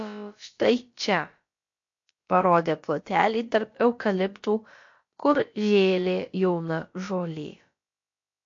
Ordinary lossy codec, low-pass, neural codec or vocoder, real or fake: MP3, 48 kbps; 7.2 kHz; codec, 16 kHz, about 1 kbps, DyCAST, with the encoder's durations; fake